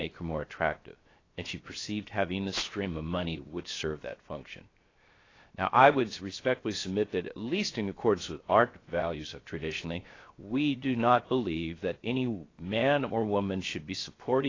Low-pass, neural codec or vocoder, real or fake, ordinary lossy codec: 7.2 kHz; codec, 16 kHz, 0.7 kbps, FocalCodec; fake; AAC, 32 kbps